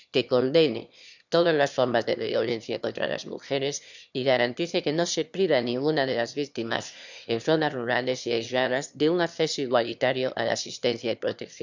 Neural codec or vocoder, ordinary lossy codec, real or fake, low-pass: autoencoder, 22.05 kHz, a latent of 192 numbers a frame, VITS, trained on one speaker; none; fake; 7.2 kHz